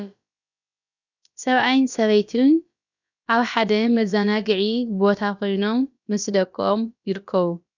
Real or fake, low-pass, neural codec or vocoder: fake; 7.2 kHz; codec, 16 kHz, about 1 kbps, DyCAST, with the encoder's durations